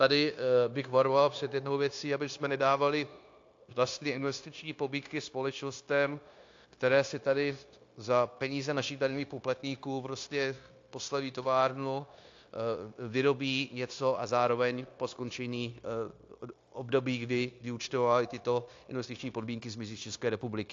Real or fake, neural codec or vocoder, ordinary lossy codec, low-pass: fake; codec, 16 kHz, 0.9 kbps, LongCat-Audio-Codec; AAC, 48 kbps; 7.2 kHz